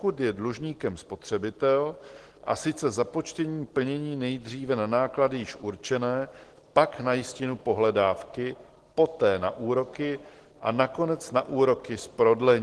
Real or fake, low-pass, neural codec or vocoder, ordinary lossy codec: real; 10.8 kHz; none; Opus, 16 kbps